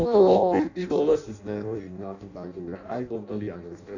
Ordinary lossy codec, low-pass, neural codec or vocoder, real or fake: none; 7.2 kHz; codec, 16 kHz in and 24 kHz out, 0.6 kbps, FireRedTTS-2 codec; fake